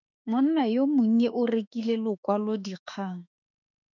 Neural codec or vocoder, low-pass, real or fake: autoencoder, 48 kHz, 32 numbers a frame, DAC-VAE, trained on Japanese speech; 7.2 kHz; fake